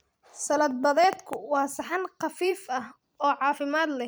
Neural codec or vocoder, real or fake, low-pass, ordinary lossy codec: vocoder, 44.1 kHz, 128 mel bands every 256 samples, BigVGAN v2; fake; none; none